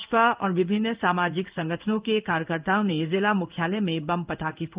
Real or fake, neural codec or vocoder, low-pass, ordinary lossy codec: fake; codec, 16 kHz in and 24 kHz out, 1 kbps, XY-Tokenizer; 3.6 kHz; Opus, 32 kbps